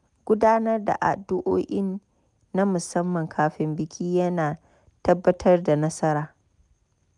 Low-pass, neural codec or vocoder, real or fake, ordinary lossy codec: 10.8 kHz; none; real; none